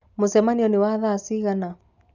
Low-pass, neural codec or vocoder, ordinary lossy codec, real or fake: 7.2 kHz; none; none; real